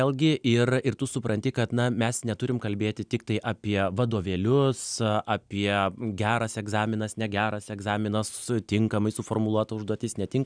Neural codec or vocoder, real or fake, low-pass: none; real; 9.9 kHz